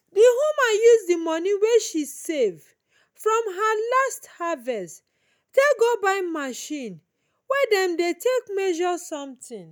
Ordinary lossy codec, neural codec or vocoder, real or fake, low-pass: none; none; real; none